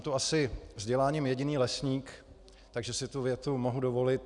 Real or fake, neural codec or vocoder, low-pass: real; none; 10.8 kHz